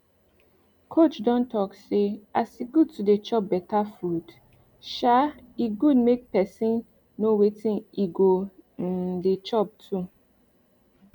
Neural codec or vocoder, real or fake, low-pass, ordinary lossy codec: none; real; 19.8 kHz; none